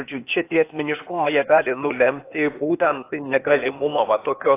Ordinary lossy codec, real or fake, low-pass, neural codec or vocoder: AAC, 24 kbps; fake; 3.6 kHz; codec, 16 kHz, 0.8 kbps, ZipCodec